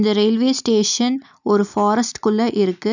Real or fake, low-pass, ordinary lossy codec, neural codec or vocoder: real; 7.2 kHz; none; none